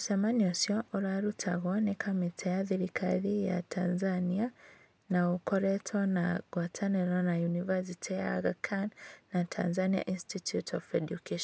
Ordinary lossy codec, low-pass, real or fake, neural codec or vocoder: none; none; real; none